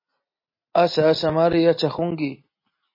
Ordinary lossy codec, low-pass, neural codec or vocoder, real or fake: MP3, 24 kbps; 5.4 kHz; none; real